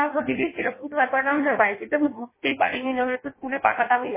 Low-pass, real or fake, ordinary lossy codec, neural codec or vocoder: 3.6 kHz; fake; MP3, 16 kbps; codec, 16 kHz in and 24 kHz out, 0.6 kbps, FireRedTTS-2 codec